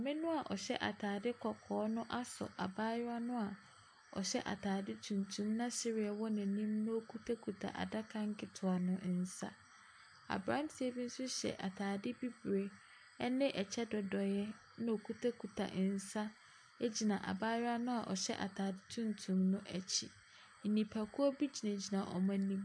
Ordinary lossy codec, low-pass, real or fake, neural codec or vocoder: AAC, 64 kbps; 9.9 kHz; real; none